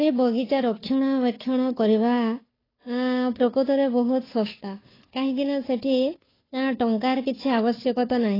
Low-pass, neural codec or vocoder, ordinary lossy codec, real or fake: 5.4 kHz; codec, 16 kHz, 2 kbps, FunCodec, trained on Chinese and English, 25 frames a second; AAC, 24 kbps; fake